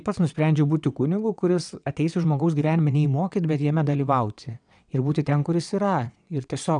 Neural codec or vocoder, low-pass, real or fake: vocoder, 22.05 kHz, 80 mel bands, WaveNeXt; 9.9 kHz; fake